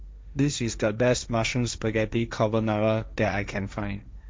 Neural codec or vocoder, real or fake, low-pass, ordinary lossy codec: codec, 16 kHz, 1.1 kbps, Voila-Tokenizer; fake; none; none